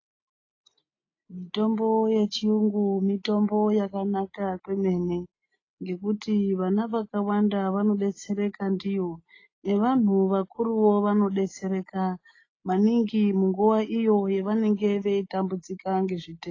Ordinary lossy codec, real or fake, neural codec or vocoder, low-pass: AAC, 32 kbps; real; none; 7.2 kHz